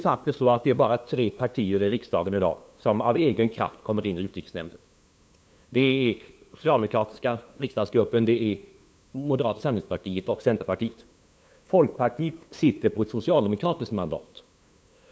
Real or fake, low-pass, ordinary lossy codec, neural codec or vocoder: fake; none; none; codec, 16 kHz, 2 kbps, FunCodec, trained on LibriTTS, 25 frames a second